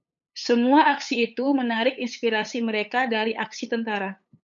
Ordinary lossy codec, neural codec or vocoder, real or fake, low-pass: MP3, 64 kbps; codec, 16 kHz, 8 kbps, FunCodec, trained on LibriTTS, 25 frames a second; fake; 7.2 kHz